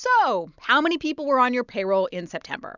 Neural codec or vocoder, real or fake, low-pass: none; real; 7.2 kHz